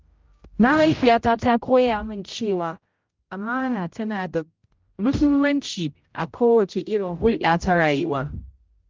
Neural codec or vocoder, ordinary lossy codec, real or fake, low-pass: codec, 16 kHz, 0.5 kbps, X-Codec, HuBERT features, trained on general audio; Opus, 32 kbps; fake; 7.2 kHz